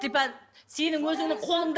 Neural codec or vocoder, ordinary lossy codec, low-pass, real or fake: none; none; none; real